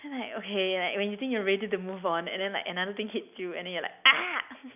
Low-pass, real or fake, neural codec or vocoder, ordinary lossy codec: 3.6 kHz; real; none; none